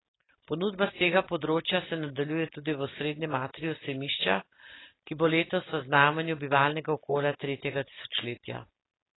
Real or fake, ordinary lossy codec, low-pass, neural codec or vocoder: real; AAC, 16 kbps; 7.2 kHz; none